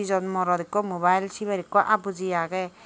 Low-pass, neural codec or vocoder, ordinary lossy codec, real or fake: none; none; none; real